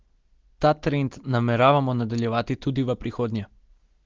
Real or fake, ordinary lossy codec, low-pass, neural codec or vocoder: real; Opus, 16 kbps; 7.2 kHz; none